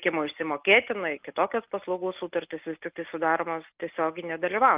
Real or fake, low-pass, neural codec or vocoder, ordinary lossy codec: real; 3.6 kHz; none; Opus, 24 kbps